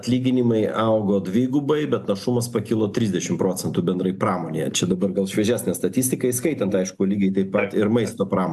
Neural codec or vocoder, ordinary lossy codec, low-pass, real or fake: none; AAC, 96 kbps; 14.4 kHz; real